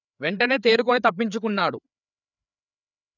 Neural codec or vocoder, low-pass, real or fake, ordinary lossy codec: codec, 16 kHz, 4 kbps, FreqCodec, larger model; 7.2 kHz; fake; none